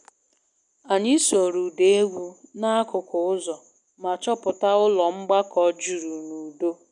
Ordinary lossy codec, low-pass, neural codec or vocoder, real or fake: none; 10.8 kHz; none; real